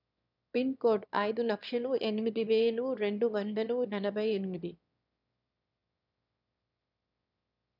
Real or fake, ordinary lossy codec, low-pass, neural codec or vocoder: fake; none; 5.4 kHz; autoencoder, 22.05 kHz, a latent of 192 numbers a frame, VITS, trained on one speaker